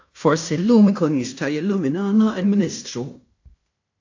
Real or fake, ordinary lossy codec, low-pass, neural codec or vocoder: fake; AAC, 48 kbps; 7.2 kHz; codec, 16 kHz in and 24 kHz out, 0.9 kbps, LongCat-Audio-Codec, fine tuned four codebook decoder